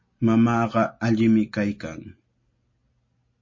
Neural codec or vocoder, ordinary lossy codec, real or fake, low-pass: none; MP3, 32 kbps; real; 7.2 kHz